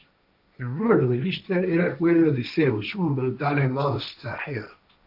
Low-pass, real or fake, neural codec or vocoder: 5.4 kHz; fake; codec, 16 kHz, 1.1 kbps, Voila-Tokenizer